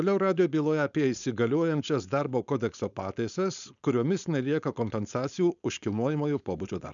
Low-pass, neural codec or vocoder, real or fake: 7.2 kHz; codec, 16 kHz, 4.8 kbps, FACodec; fake